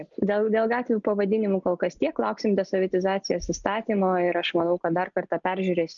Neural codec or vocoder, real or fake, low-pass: none; real; 7.2 kHz